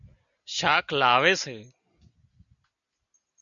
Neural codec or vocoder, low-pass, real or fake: none; 7.2 kHz; real